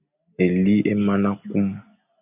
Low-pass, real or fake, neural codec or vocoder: 3.6 kHz; real; none